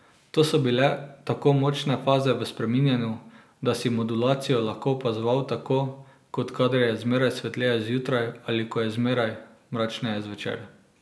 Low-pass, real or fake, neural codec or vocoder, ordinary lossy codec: none; real; none; none